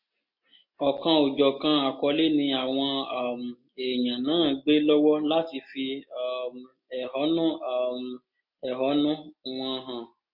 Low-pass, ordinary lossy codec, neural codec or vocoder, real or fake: 5.4 kHz; MP3, 32 kbps; none; real